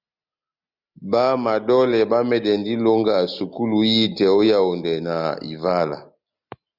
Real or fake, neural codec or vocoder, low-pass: real; none; 5.4 kHz